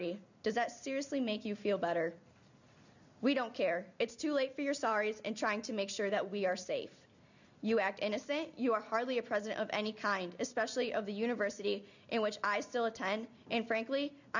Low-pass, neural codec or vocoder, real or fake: 7.2 kHz; none; real